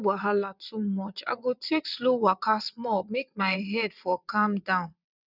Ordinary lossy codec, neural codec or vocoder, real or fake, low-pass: none; vocoder, 22.05 kHz, 80 mel bands, WaveNeXt; fake; 5.4 kHz